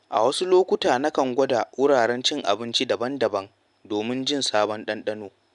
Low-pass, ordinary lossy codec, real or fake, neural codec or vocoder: 10.8 kHz; none; real; none